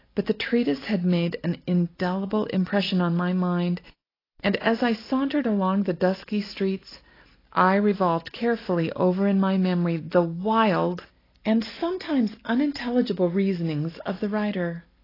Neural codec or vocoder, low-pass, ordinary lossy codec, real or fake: none; 5.4 kHz; AAC, 24 kbps; real